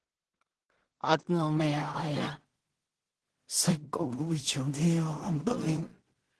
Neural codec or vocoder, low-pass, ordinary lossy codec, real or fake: codec, 16 kHz in and 24 kHz out, 0.4 kbps, LongCat-Audio-Codec, two codebook decoder; 10.8 kHz; Opus, 16 kbps; fake